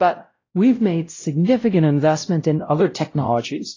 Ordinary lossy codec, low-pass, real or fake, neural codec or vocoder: AAC, 32 kbps; 7.2 kHz; fake; codec, 16 kHz, 0.5 kbps, X-Codec, WavLM features, trained on Multilingual LibriSpeech